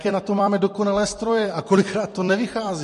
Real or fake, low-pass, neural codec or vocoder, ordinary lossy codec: fake; 14.4 kHz; vocoder, 44.1 kHz, 128 mel bands every 256 samples, BigVGAN v2; MP3, 48 kbps